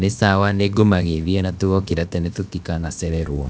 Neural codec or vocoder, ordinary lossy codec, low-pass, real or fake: codec, 16 kHz, about 1 kbps, DyCAST, with the encoder's durations; none; none; fake